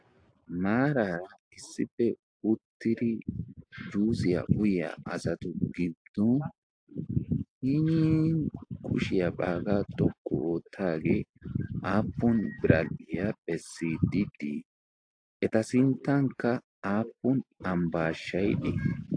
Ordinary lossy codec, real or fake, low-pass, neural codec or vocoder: AAC, 48 kbps; real; 9.9 kHz; none